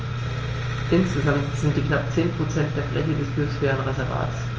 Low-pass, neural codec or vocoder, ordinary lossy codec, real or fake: 7.2 kHz; none; Opus, 24 kbps; real